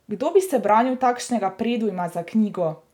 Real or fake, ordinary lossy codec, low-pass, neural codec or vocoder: real; none; 19.8 kHz; none